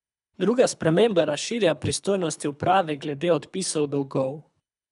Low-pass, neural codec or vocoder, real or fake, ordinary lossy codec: 10.8 kHz; codec, 24 kHz, 3 kbps, HILCodec; fake; none